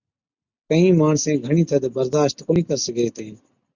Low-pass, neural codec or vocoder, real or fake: 7.2 kHz; none; real